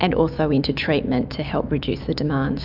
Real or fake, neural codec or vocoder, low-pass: fake; autoencoder, 48 kHz, 128 numbers a frame, DAC-VAE, trained on Japanese speech; 5.4 kHz